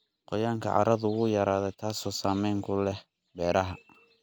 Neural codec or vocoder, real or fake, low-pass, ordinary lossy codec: none; real; none; none